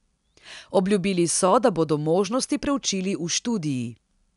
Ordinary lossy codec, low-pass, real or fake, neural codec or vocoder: none; 10.8 kHz; real; none